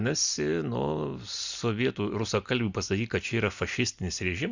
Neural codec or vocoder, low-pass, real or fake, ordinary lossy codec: none; 7.2 kHz; real; Opus, 64 kbps